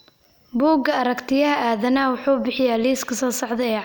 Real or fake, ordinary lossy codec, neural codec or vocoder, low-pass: real; none; none; none